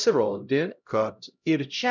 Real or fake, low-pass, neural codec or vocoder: fake; 7.2 kHz; codec, 16 kHz, 0.5 kbps, X-Codec, HuBERT features, trained on LibriSpeech